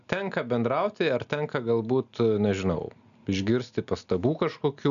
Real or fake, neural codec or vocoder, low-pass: real; none; 7.2 kHz